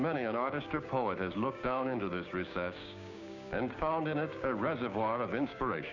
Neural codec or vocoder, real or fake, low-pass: codec, 16 kHz, 6 kbps, DAC; fake; 7.2 kHz